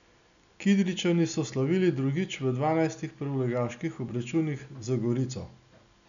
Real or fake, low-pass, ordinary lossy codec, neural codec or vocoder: real; 7.2 kHz; none; none